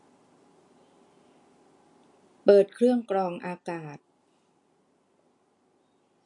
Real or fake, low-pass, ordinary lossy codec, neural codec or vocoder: real; 10.8 kHz; MP3, 48 kbps; none